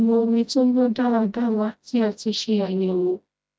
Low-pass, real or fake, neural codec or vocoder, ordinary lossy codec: none; fake; codec, 16 kHz, 0.5 kbps, FreqCodec, smaller model; none